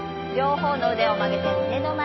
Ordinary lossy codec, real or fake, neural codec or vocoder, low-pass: MP3, 24 kbps; real; none; 7.2 kHz